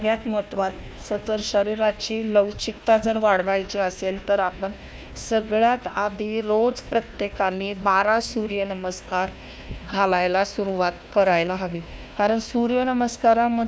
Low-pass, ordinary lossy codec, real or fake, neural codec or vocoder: none; none; fake; codec, 16 kHz, 1 kbps, FunCodec, trained on Chinese and English, 50 frames a second